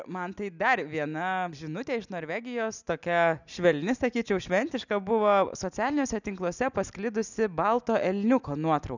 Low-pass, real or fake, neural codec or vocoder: 7.2 kHz; real; none